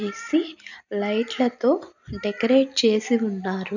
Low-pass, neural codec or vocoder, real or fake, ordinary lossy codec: 7.2 kHz; none; real; none